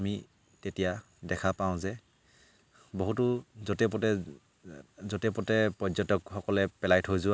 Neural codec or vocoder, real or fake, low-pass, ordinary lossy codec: none; real; none; none